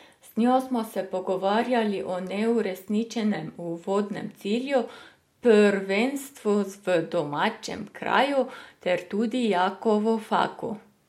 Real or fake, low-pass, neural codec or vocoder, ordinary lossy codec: real; 19.8 kHz; none; MP3, 64 kbps